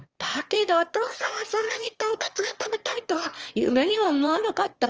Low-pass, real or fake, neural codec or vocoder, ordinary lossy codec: 7.2 kHz; fake; autoencoder, 22.05 kHz, a latent of 192 numbers a frame, VITS, trained on one speaker; Opus, 24 kbps